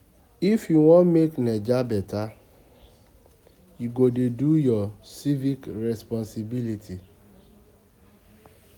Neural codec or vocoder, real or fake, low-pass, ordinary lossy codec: none; real; 19.8 kHz; none